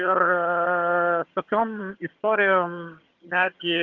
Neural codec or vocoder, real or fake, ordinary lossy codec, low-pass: vocoder, 22.05 kHz, 80 mel bands, HiFi-GAN; fake; Opus, 32 kbps; 7.2 kHz